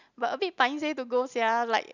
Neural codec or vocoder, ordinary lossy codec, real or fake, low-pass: none; none; real; 7.2 kHz